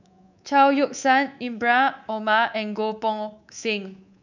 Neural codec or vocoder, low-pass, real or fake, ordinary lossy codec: codec, 24 kHz, 3.1 kbps, DualCodec; 7.2 kHz; fake; none